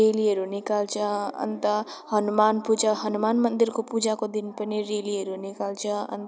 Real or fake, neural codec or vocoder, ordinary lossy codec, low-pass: real; none; none; none